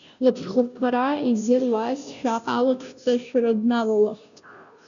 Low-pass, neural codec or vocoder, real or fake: 7.2 kHz; codec, 16 kHz, 0.5 kbps, FunCodec, trained on Chinese and English, 25 frames a second; fake